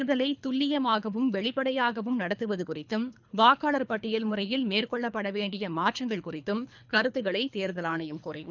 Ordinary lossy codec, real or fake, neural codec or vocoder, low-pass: none; fake; codec, 24 kHz, 3 kbps, HILCodec; 7.2 kHz